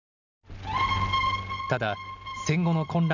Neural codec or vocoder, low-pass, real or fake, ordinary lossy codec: none; 7.2 kHz; real; none